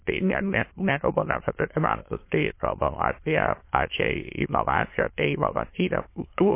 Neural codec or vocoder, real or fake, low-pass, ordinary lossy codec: autoencoder, 22.05 kHz, a latent of 192 numbers a frame, VITS, trained on many speakers; fake; 3.6 kHz; MP3, 24 kbps